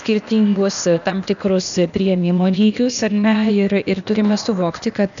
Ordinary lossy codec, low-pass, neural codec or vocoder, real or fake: MP3, 96 kbps; 7.2 kHz; codec, 16 kHz, 0.8 kbps, ZipCodec; fake